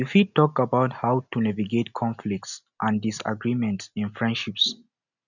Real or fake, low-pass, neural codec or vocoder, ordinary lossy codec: real; 7.2 kHz; none; none